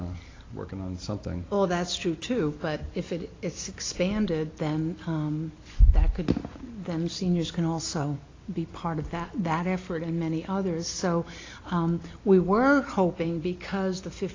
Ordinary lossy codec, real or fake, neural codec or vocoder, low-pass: AAC, 32 kbps; real; none; 7.2 kHz